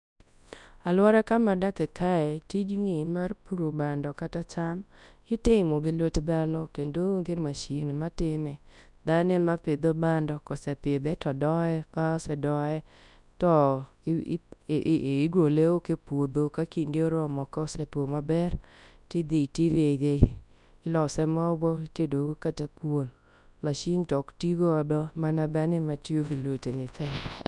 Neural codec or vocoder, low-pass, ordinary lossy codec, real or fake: codec, 24 kHz, 0.9 kbps, WavTokenizer, large speech release; 10.8 kHz; none; fake